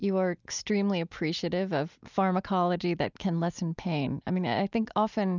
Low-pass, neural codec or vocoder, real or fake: 7.2 kHz; none; real